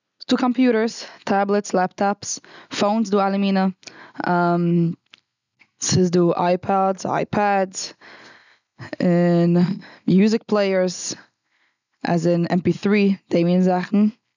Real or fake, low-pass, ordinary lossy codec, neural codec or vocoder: real; 7.2 kHz; none; none